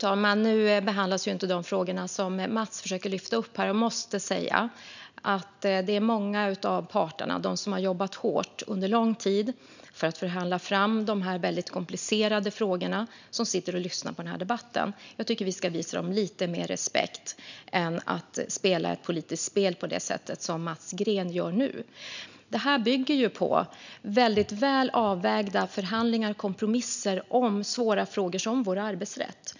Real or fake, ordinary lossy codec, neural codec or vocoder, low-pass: real; none; none; 7.2 kHz